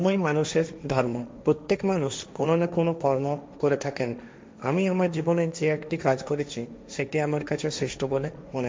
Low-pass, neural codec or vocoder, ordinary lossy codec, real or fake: none; codec, 16 kHz, 1.1 kbps, Voila-Tokenizer; none; fake